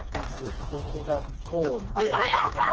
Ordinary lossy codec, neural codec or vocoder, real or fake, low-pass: Opus, 16 kbps; codec, 16 kHz, 2 kbps, FreqCodec, smaller model; fake; 7.2 kHz